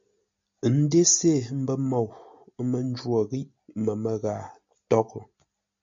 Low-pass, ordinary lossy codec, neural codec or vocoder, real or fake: 7.2 kHz; MP3, 48 kbps; none; real